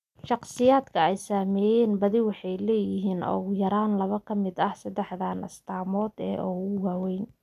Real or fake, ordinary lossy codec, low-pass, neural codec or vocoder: real; none; 14.4 kHz; none